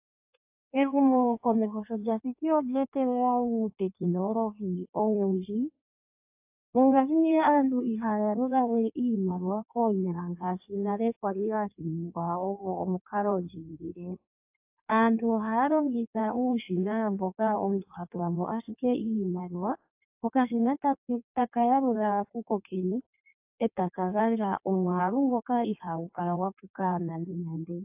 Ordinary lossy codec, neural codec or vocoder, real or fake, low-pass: AAC, 32 kbps; codec, 16 kHz in and 24 kHz out, 1.1 kbps, FireRedTTS-2 codec; fake; 3.6 kHz